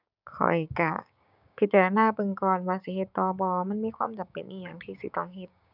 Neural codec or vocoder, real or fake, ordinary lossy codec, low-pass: codec, 16 kHz, 6 kbps, DAC; fake; none; 5.4 kHz